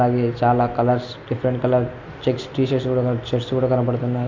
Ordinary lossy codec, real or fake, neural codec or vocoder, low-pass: MP3, 48 kbps; real; none; 7.2 kHz